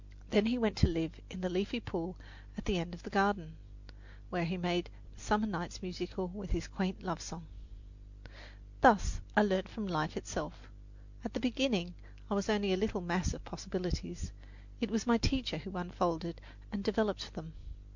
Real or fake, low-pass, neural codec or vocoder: real; 7.2 kHz; none